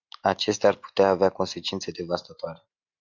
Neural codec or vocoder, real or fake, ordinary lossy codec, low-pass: none; real; Opus, 64 kbps; 7.2 kHz